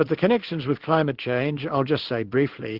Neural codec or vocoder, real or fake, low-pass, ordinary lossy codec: codec, 16 kHz in and 24 kHz out, 1 kbps, XY-Tokenizer; fake; 5.4 kHz; Opus, 16 kbps